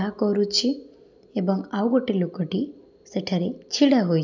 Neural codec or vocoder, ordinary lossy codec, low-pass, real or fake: none; none; 7.2 kHz; real